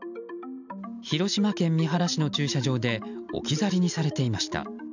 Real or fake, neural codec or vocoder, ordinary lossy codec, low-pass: real; none; none; 7.2 kHz